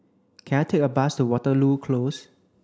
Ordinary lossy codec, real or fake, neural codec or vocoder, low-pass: none; real; none; none